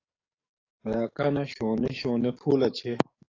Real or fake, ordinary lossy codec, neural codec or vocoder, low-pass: fake; AAC, 32 kbps; codec, 44.1 kHz, 7.8 kbps, DAC; 7.2 kHz